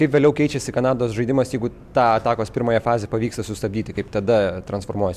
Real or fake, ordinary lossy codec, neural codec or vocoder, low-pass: real; MP3, 96 kbps; none; 14.4 kHz